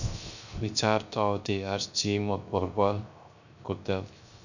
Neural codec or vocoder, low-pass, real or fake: codec, 16 kHz, 0.3 kbps, FocalCodec; 7.2 kHz; fake